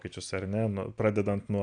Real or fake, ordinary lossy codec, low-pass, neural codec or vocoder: real; AAC, 64 kbps; 9.9 kHz; none